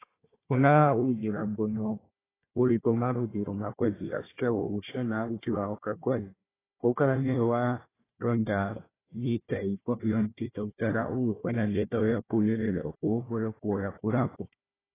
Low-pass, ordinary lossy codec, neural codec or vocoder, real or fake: 3.6 kHz; AAC, 16 kbps; codec, 16 kHz, 1 kbps, FunCodec, trained on Chinese and English, 50 frames a second; fake